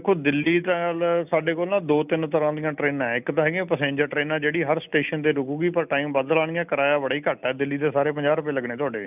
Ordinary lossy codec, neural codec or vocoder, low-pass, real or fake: none; none; 3.6 kHz; real